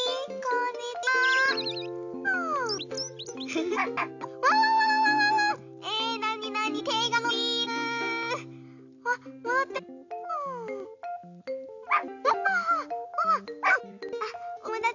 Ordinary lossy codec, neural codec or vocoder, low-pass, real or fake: none; none; 7.2 kHz; real